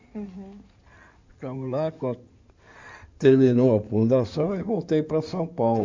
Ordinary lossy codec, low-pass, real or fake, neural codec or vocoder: MP3, 64 kbps; 7.2 kHz; fake; codec, 16 kHz in and 24 kHz out, 2.2 kbps, FireRedTTS-2 codec